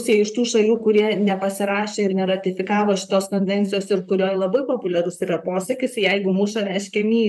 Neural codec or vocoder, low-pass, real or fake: vocoder, 44.1 kHz, 128 mel bands, Pupu-Vocoder; 14.4 kHz; fake